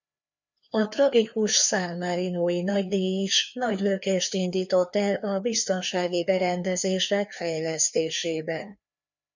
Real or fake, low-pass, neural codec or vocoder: fake; 7.2 kHz; codec, 16 kHz, 2 kbps, FreqCodec, larger model